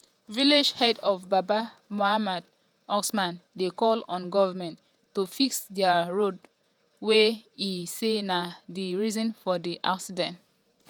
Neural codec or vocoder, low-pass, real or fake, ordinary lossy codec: vocoder, 48 kHz, 128 mel bands, Vocos; none; fake; none